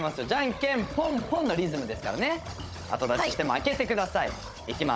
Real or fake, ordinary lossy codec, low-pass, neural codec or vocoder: fake; none; none; codec, 16 kHz, 16 kbps, FunCodec, trained on Chinese and English, 50 frames a second